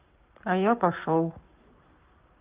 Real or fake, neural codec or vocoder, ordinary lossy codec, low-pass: fake; codec, 44.1 kHz, 7.8 kbps, Pupu-Codec; Opus, 32 kbps; 3.6 kHz